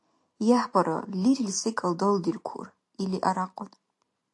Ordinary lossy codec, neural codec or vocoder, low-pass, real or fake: AAC, 48 kbps; none; 10.8 kHz; real